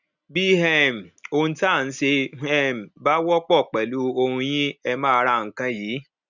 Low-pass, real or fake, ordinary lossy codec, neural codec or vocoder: 7.2 kHz; real; none; none